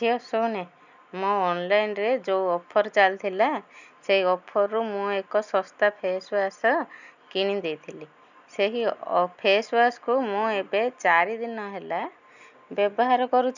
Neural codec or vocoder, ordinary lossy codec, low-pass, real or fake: none; none; 7.2 kHz; real